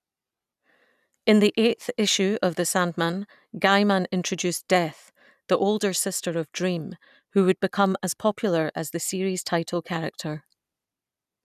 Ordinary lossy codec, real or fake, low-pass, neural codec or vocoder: none; real; 14.4 kHz; none